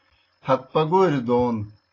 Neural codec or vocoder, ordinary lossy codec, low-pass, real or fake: none; AAC, 32 kbps; 7.2 kHz; real